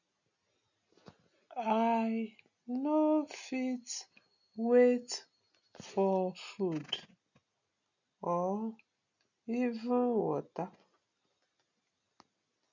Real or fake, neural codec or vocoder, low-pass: real; none; 7.2 kHz